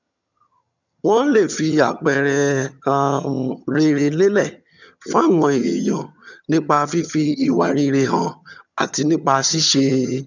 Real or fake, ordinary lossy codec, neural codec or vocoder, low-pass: fake; none; vocoder, 22.05 kHz, 80 mel bands, HiFi-GAN; 7.2 kHz